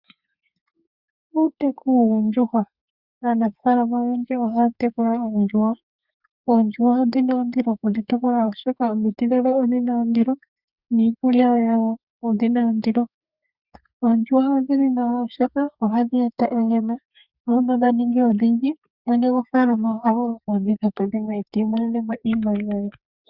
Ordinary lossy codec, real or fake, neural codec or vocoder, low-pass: Opus, 64 kbps; fake; codec, 32 kHz, 1.9 kbps, SNAC; 5.4 kHz